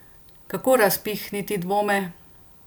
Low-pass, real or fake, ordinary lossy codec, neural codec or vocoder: none; real; none; none